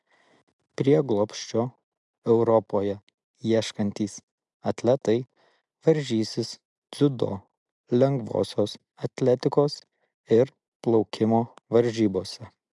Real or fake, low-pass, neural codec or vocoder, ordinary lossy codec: real; 10.8 kHz; none; MP3, 96 kbps